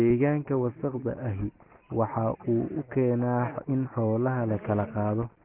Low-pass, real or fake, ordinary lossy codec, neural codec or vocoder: 3.6 kHz; real; Opus, 16 kbps; none